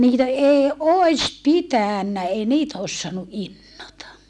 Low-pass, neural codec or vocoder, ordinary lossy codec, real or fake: none; none; none; real